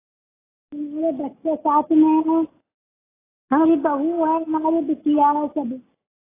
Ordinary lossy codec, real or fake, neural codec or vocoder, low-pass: AAC, 16 kbps; real; none; 3.6 kHz